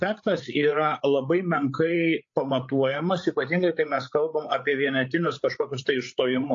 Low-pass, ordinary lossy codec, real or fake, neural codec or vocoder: 7.2 kHz; AAC, 48 kbps; fake; codec, 16 kHz, 8 kbps, FreqCodec, larger model